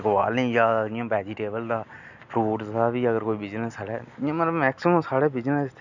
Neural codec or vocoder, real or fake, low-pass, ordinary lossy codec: none; real; 7.2 kHz; none